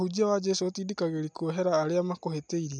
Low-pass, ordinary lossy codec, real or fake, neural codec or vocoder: none; none; real; none